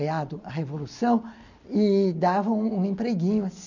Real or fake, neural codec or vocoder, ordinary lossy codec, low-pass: real; none; none; 7.2 kHz